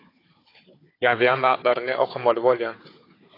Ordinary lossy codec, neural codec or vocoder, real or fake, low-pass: AAC, 24 kbps; codec, 16 kHz, 4 kbps, FunCodec, trained on Chinese and English, 50 frames a second; fake; 5.4 kHz